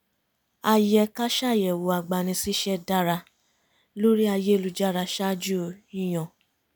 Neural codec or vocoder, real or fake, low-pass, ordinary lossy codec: none; real; none; none